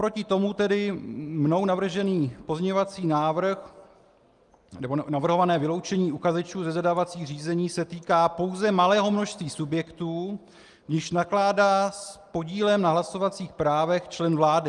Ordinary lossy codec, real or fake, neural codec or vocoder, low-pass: Opus, 32 kbps; real; none; 10.8 kHz